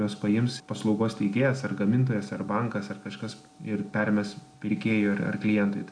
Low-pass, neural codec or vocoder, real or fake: 9.9 kHz; none; real